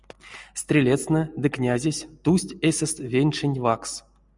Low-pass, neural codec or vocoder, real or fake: 10.8 kHz; none; real